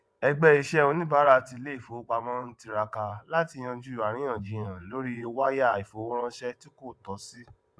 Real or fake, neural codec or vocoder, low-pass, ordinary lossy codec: fake; vocoder, 22.05 kHz, 80 mel bands, WaveNeXt; none; none